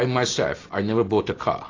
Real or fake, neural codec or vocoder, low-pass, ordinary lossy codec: real; none; 7.2 kHz; AAC, 32 kbps